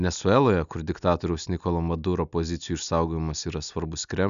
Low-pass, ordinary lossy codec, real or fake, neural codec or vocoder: 7.2 kHz; MP3, 96 kbps; real; none